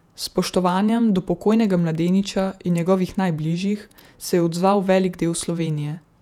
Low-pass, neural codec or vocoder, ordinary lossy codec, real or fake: 19.8 kHz; vocoder, 48 kHz, 128 mel bands, Vocos; none; fake